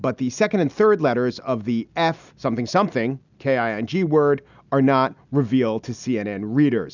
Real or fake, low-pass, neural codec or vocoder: real; 7.2 kHz; none